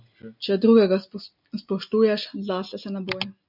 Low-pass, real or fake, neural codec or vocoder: 5.4 kHz; real; none